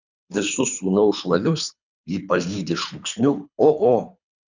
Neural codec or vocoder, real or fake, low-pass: codec, 24 kHz, 3 kbps, HILCodec; fake; 7.2 kHz